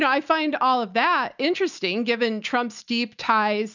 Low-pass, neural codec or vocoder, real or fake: 7.2 kHz; none; real